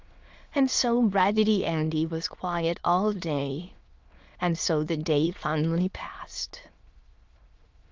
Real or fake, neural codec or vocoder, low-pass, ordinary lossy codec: fake; autoencoder, 22.05 kHz, a latent of 192 numbers a frame, VITS, trained on many speakers; 7.2 kHz; Opus, 32 kbps